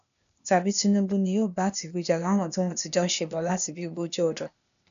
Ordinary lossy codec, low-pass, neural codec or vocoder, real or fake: none; 7.2 kHz; codec, 16 kHz, 0.8 kbps, ZipCodec; fake